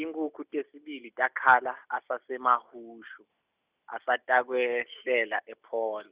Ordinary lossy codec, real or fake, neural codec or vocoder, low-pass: Opus, 64 kbps; real; none; 3.6 kHz